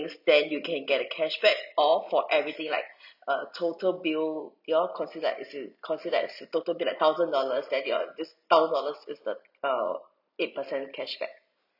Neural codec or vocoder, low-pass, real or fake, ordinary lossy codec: none; 5.4 kHz; real; none